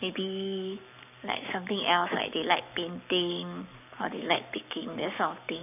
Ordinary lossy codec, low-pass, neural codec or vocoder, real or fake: none; 3.6 kHz; codec, 44.1 kHz, 7.8 kbps, Pupu-Codec; fake